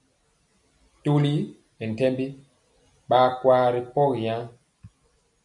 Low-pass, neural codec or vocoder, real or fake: 10.8 kHz; none; real